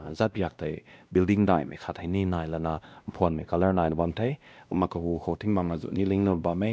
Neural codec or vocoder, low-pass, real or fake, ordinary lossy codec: codec, 16 kHz, 1 kbps, X-Codec, WavLM features, trained on Multilingual LibriSpeech; none; fake; none